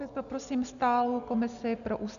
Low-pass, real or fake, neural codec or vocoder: 7.2 kHz; real; none